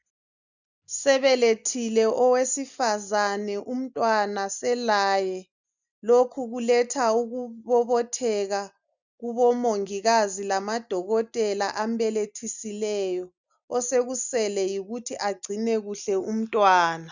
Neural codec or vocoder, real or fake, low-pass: none; real; 7.2 kHz